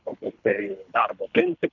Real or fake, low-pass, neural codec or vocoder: fake; 7.2 kHz; codec, 24 kHz, 3 kbps, HILCodec